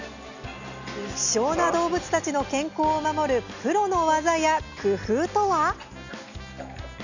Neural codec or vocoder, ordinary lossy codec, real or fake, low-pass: none; none; real; 7.2 kHz